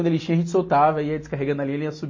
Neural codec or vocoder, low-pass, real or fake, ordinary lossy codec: none; 7.2 kHz; real; MP3, 32 kbps